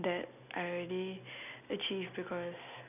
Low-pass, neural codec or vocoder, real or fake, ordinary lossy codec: 3.6 kHz; none; real; none